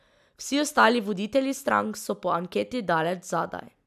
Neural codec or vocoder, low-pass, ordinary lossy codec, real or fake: none; 14.4 kHz; none; real